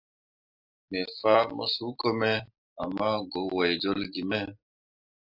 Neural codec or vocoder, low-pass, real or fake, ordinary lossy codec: none; 5.4 kHz; real; AAC, 48 kbps